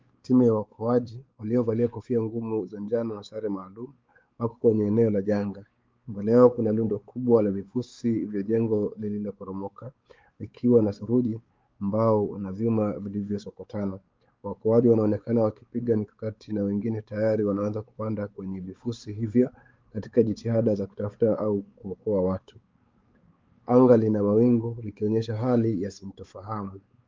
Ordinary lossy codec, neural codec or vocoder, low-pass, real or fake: Opus, 32 kbps; codec, 16 kHz, 4 kbps, X-Codec, WavLM features, trained on Multilingual LibriSpeech; 7.2 kHz; fake